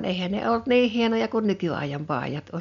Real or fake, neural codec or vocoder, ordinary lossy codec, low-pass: real; none; none; 7.2 kHz